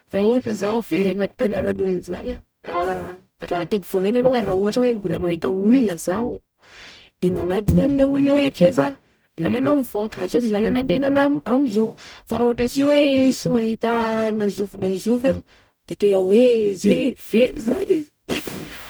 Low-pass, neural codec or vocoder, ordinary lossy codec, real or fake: none; codec, 44.1 kHz, 0.9 kbps, DAC; none; fake